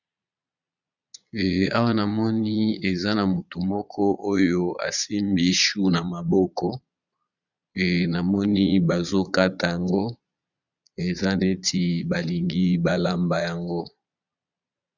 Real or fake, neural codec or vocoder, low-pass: fake; vocoder, 22.05 kHz, 80 mel bands, Vocos; 7.2 kHz